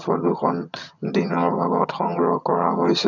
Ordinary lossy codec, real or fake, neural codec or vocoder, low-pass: none; fake; vocoder, 22.05 kHz, 80 mel bands, HiFi-GAN; 7.2 kHz